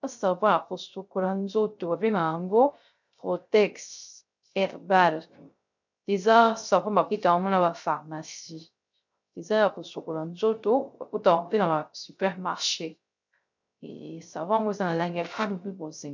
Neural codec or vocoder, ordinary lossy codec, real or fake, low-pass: codec, 16 kHz, 0.3 kbps, FocalCodec; MP3, 64 kbps; fake; 7.2 kHz